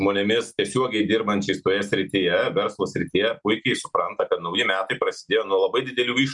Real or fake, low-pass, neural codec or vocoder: real; 10.8 kHz; none